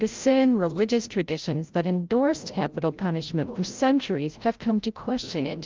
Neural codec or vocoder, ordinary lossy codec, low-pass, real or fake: codec, 16 kHz, 0.5 kbps, FreqCodec, larger model; Opus, 32 kbps; 7.2 kHz; fake